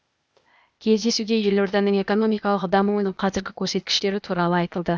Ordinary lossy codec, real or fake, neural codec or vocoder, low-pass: none; fake; codec, 16 kHz, 0.8 kbps, ZipCodec; none